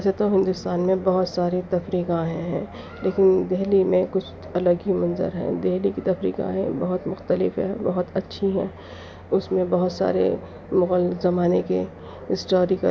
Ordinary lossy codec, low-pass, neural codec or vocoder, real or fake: none; none; none; real